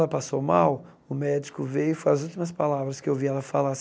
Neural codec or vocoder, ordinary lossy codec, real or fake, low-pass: none; none; real; none